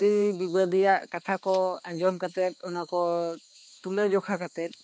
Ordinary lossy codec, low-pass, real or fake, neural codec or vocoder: none; none; fake; codec, 16 kHz, 2 kbps, X-Codec, HuBERT features, trained on balanced general audio